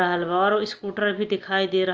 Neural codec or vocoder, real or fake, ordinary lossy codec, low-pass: none; real; Opus, 24 kbps; 7.2 kHz